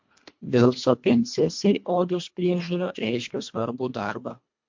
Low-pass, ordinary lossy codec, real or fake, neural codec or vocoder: 7.2 kHz; MP3, 48 kbps; fake; codec, 24 kHz, 1.5 kbps, HILCodec